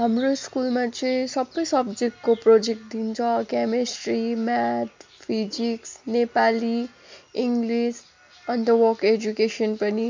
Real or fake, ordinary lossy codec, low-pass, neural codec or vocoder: real; MP3, 64 kbps; 7.2 kHz; none